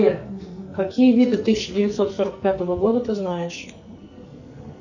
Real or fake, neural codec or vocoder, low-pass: fake; codec, 44.1 kHz, 2.6 kbps, SNAC; 7.2 kHz